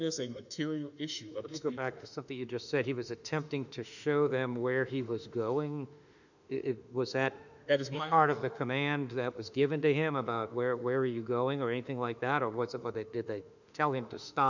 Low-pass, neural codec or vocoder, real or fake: 7.2 kHz; autoencoder, 48 kHz, 32 numbers a frame, DAC-VAE, trained on Japanese speech; fake